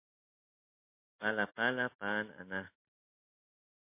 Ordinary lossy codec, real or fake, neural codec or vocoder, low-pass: MP3, 24 kbps; real; none; 3.6 kHz